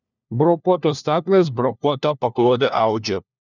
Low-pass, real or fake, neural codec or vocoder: 7.2 kHz; fake; codec, 16 kHz, 1 kbps, FunCodec, trained on LibriTTS, 50 frames a second